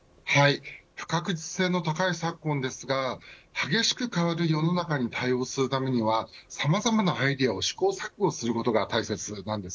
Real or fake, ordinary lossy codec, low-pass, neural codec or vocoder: real; none; none; none